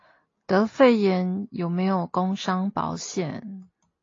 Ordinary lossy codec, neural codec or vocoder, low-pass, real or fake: AAC, 32 kbps; none; 7.2 kHz; real